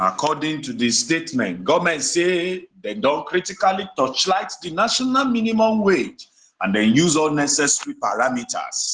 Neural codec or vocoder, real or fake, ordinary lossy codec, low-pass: none; real; Opus, 16 kbps; 9.9 kHz